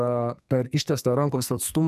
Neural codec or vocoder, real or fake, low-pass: codec, 44.1 kHz, 2.6 kbps, SNAC; fake; 14.4 kHz